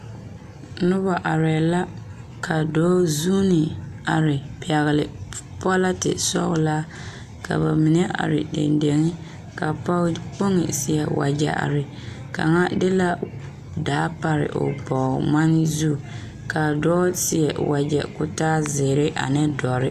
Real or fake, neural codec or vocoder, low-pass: real; none; 14.4 kHz